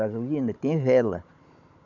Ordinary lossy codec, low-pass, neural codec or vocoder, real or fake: none; 7.2 kHz; codec, 16 kHz, 16 kbps, FunCodec, trained on Chinese and English, 50 frames a second; fake